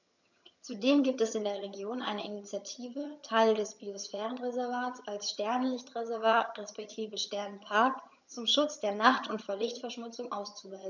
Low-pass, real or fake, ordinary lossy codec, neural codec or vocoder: 7.2 kHz; fake; none; vocoder, 22.05 kHz, 80 mel bands, HiFi-GAN